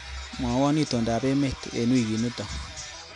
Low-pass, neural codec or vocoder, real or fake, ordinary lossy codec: 10.8 kHz; none; real; none